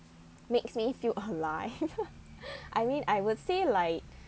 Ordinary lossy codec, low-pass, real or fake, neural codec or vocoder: none; none; real; none